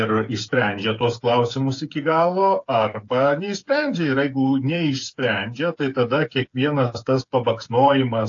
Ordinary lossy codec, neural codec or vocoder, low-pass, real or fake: AAC, 48 kbps; codec, 16 kHz, 8 kbps, FreqCodec, smaller model; 7.2 kHz; fake